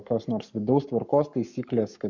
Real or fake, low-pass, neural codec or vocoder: fake; 7.2 kHz; codec, 44.1 kHz, 7.8 kbps, Pupu-Codec